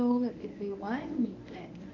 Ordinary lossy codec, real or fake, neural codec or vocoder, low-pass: none; fake; codec, 24 kHz, 0.9 kbps, WavTokenizer, medium speech release version 1; 7.2 kHz